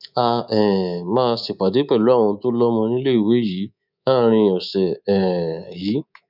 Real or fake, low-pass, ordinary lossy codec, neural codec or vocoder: fake; 5.4 kHz; none; codec, 24 kHz, 3.1 kbps, DualCodec